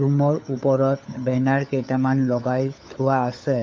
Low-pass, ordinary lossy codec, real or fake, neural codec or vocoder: none; none; fake; codec, 16 kHz, 4 kbps, FunCodec, trained on LibriTTS, 50 frames a second